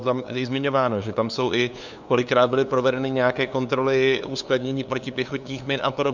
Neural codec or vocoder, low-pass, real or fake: codec, 16 kHz, 2 kbps, FunCodec, trained on LibriTTS, 25 frames a second; 7.2 kHz; fake